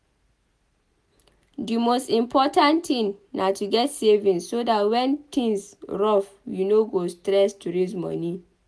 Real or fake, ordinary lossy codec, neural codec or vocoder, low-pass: real; none; none; none